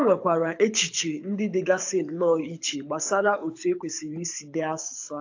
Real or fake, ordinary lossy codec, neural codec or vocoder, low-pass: fake; AAC, 48 kbps; codec, 24 kHz, 6 kbps, HILCodec; 7.2 kHz